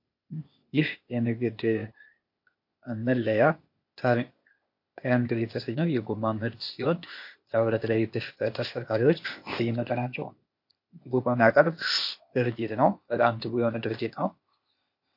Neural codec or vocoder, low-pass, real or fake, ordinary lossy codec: codec, 16 kHz, 0.8 kbps, ZipCodec; 5.4 kHz; fake; MP3, 32 kbps